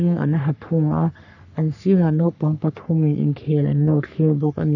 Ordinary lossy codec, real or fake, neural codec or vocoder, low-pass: none; fake; codec, 44.1 kHz, 3.4 kbps, Pupu-Codec; 7.2 kHz